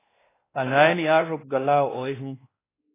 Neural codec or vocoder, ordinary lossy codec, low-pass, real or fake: codec, 16 kHz, 1 kbps, X-Codec, WavLM features, trained on Multilingual LibriSpeech; AAC, 16 kbps; 3.6 kHz; fake